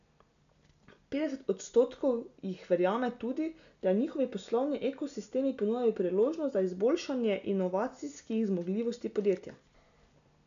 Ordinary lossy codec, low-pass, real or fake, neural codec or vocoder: none; 7.2 kHz; real; none